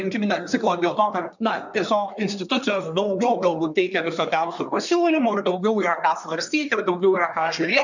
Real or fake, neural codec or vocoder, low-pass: fake; codec, 24 kHz, 1 kbps, SNAC; 7.2 kHz